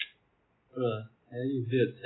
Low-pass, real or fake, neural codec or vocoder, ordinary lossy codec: 7.2 kHz; real; none; AAC, 16 kbps